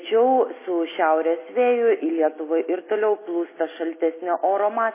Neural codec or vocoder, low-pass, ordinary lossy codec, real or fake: none; 3.6 kHz; MP3, 16 kbps; real